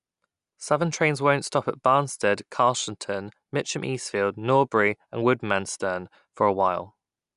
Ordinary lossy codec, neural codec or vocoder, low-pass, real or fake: none; none; 10.8 kHz; real